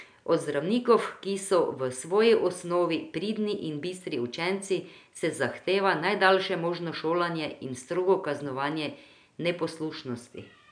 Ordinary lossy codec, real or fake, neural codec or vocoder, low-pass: none; real; none; 9.9 kHz